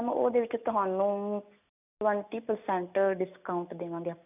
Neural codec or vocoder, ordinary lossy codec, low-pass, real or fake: none; none; 3.6 kHz; real